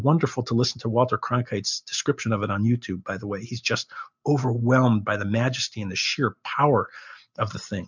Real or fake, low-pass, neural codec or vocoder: real; 7.2 kHz; none